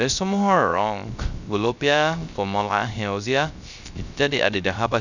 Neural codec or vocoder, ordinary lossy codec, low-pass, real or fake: codec, 16 kHz, 0.3 kbps, FocalCodec; none; 7.2 kHz; fake